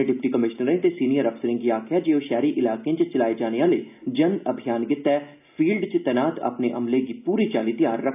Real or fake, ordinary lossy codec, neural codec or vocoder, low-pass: real; none; none; 3.6 kHz